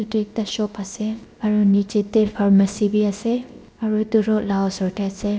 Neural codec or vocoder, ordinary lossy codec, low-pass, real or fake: codec, 16 kHz, 0.7 kbps, FocalCodec; none; none; fake